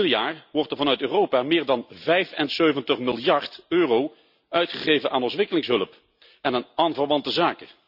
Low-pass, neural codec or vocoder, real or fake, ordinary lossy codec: 5.4 kHz; none; real; none